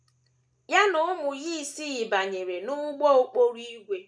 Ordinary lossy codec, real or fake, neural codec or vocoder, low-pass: none; real; none; none